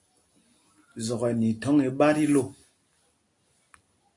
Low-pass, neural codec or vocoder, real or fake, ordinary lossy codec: 10.8 kHz; none; real; AAC, 48 kbps